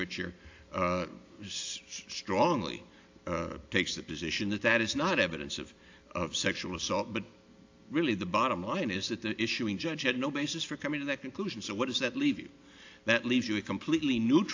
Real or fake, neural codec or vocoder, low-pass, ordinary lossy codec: real; none; 7.2 kHz; AAC, 48 kbps